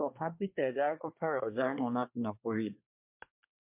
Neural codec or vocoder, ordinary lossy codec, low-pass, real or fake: codec, 24 kHz, 1 kbps, SNAC; MP3, 32 kbps; 3.6 kHz; fake